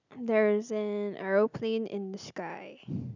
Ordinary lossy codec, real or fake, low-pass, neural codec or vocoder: none; real; 7.2 kHz; none